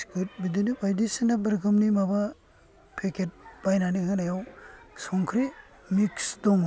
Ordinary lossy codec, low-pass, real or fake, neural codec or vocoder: none; none; real; none